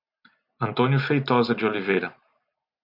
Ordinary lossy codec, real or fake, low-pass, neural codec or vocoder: MP3, 48 kbps; real; 5.4 kHz; none